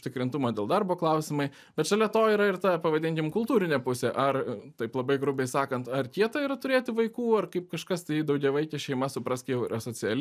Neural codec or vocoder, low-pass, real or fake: none; 14.4 kHz; real